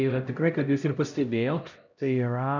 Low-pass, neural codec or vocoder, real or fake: 7.2 kHz; codec, 16 kHz, 0.5 kbps, X-Codec, HuBERT features, trained on LibriSpeech; fake